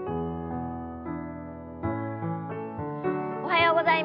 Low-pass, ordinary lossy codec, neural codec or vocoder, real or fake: 5.4 kHz; none; none; real